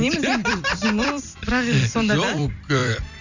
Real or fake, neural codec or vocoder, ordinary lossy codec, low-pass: fake; vocoder, 44.1 kHz, 128 mel bands every 256 samples, BigVGAN v2; none; 7.2 kHz